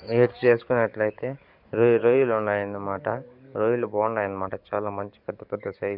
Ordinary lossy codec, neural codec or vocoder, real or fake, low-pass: none; codec, 44.1 kHz, 7.8 kbps, DAC; fake; 5.4 kHz